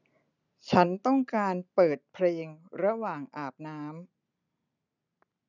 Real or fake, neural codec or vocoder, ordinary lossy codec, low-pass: real; none; none; 7.2 kHz